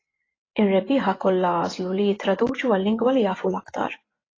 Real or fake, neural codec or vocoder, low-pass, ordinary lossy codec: fake; vocoder, 24 kHz, 100 mel bands, Vocos; 7.2 kHz; AAC, 32 kbps